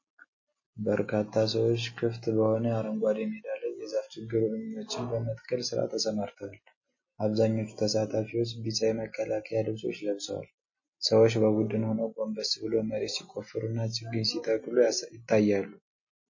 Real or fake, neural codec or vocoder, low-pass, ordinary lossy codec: real; none; 7.2 kHz; MP3, 32 kbps